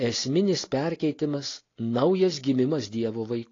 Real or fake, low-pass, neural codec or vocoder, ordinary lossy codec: real; 7.2 kHz; none; AAC, 32 kbps